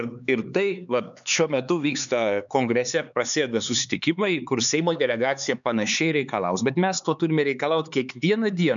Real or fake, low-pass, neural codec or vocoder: fake; 7.2 kHz; codec, 16 kHz, 4 kbps, X-Codec, HuBERT features, trained on LibriSpeech